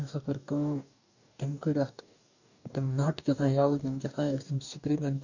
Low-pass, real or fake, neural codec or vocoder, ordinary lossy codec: 7.2 kHz; fake; codec, 44.1 kHz, 2.6 kbps, DAC; none